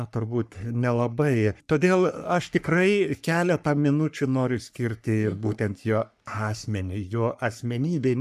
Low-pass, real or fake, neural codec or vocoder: 14.4 kHz; fake; codec, 44.1 kHz, 3.4 kbps, Pupu-Codec